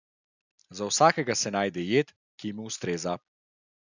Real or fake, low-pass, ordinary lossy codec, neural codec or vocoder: real; 7.2 kHz; none; none